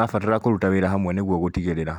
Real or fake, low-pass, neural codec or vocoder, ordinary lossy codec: real; 19.8 kHz; none; none